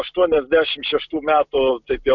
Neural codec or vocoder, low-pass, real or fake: none; 7.2 kHz; real